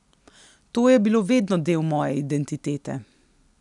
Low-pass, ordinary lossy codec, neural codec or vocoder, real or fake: 10.8 kHz; none; none; real